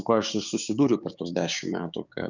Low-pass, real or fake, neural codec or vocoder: 7.2 kHz; fake; codec, 16 kHz, 6 kbps, DAC